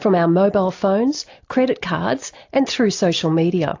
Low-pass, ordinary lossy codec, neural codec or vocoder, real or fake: 7.2 kHz; AAC, 48 kbps; none; real